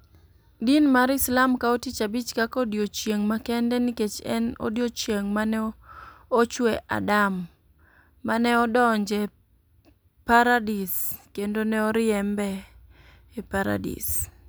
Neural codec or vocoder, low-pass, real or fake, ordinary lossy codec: none; none; real; none